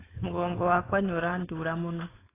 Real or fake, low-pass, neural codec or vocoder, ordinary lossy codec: fake; 3.6 kHz; codec, 16 kHz, 4.8 kbps, FACodec; AAC, 16 kbps